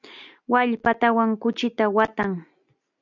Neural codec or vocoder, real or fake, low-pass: none; real; 7.2 kHz